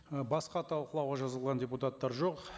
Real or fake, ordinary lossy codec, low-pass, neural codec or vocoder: real; none; none; none